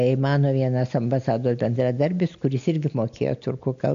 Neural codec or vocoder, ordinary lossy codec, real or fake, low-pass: none; AAC, 64 kbps; real; 7.2 kHz